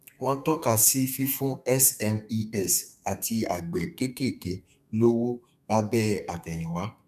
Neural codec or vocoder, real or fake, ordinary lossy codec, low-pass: codec, 32 kHz, 1.9 kbps, SNAC; fake; none; 14.4 kHz